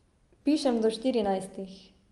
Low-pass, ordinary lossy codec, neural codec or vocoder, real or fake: 10.8 kHz; Opus, 32 kbps; none; real